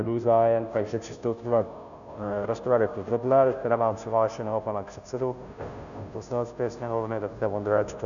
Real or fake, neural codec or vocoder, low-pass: fake; codec, 16 kHz, 0.5 kbps, FunCodec, trained on Chinese and English, 25 frames a second; 7.2 kHz